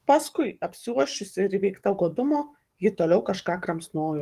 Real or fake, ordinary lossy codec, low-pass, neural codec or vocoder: fake; Opus, 24 kbps; 14.4 kHz; codec, 44.1 kHz, 7.8 kbps, DAC